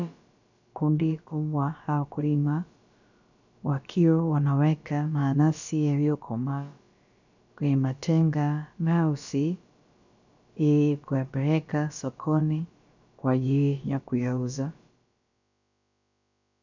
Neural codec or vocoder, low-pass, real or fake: codec, 16 kHz, about 1 kbps, DyCAST, with the encoder's durations; 7.2 kHz; fake